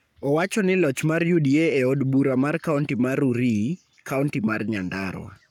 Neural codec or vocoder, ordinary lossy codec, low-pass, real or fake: codec, 44.1 kHz, 7.8 kbps, Pupu-Codec; none; 19.8 kHz; fake